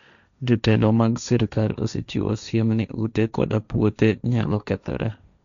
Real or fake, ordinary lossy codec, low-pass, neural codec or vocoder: fake; none; 7.2 kHz; codec, 16 kHz, 1.1 kbps, Voila-Tokenizer